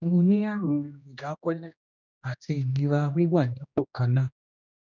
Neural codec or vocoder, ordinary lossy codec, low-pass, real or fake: codec, 16 kHz, 1 kbps, X-Codec, HuBERT features, trained on general audio; none; 7.2 kHz; fake